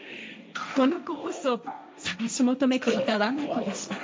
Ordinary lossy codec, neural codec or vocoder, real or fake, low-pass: none; codec, 16 kHz, 1.1 kbps, Voila-Tokenizer; fake; none